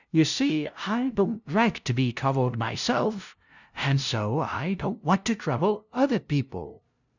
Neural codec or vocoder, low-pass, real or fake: codec, 16 kHz, 0.5 kbps, FunCodec, trained on LibriTTS, 25 frames a second; 7.2 kHz; fake